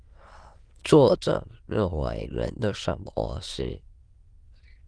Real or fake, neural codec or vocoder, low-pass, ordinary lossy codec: fake; autoencoder, 22.05 kHz, a latent of 192 numbers a frame, VITS, trained on many speakers; 9.9 kHz; Opus, 24 kbps